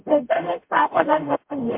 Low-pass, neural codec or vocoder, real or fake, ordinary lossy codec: 3.6 kHz; codec, 44.1 kHz, 0.9 kbps, DAC; fake; MP3, 32 kbps